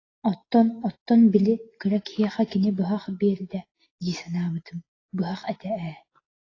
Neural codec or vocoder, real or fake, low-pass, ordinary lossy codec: none; real; 7.2 kHz; AAC, 32 kbps